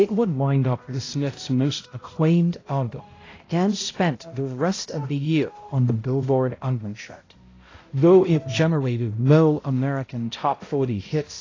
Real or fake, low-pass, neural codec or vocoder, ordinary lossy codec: fake; 7.2 kHz; codec, 16 kHz, 0.5 kbps, X-Codec, HuBERT features, trained on balanced general audio; AAC, 32 kbps